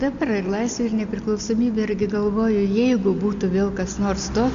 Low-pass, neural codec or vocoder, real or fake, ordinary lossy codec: 7.2 kHz; none; real; MP3, 64 kbps